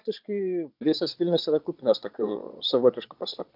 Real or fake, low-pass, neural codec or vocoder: fake; 5.4 kHz; vocoder, 22.05 kHz, 80 mel bands, Vocos